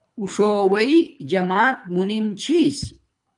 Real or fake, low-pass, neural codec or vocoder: fake; 10.8 kHz; codec, 24 kHz, 3 kbps, HILCodec